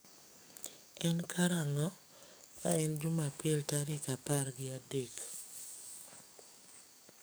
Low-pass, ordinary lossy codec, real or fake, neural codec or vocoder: none; none; fake; codec, 44.1 kHz, 7.8 kbps, DAC